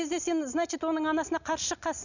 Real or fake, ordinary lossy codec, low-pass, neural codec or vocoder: real; none; 7.2 kHz; none